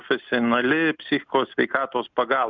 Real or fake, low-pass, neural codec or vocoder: real; 7.2 kHz; none